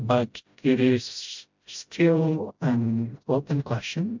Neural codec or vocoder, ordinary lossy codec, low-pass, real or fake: codec, 16 kHz, 0.5 kbps, FreqCodec, smaller model; MP3, 64 kbps; 7.2 kHz; fake